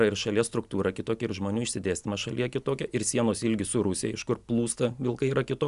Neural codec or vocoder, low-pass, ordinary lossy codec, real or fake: none; 10.8 kHz; Opus, 64 kbps; real